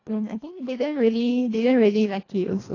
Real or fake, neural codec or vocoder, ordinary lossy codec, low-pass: fake; codec, 24 kHz, 1.5 kbps, HILCodec; AAC, 32 kbps; 7.2 kHz